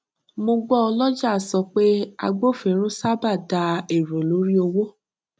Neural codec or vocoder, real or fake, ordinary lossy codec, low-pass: none; real; none; none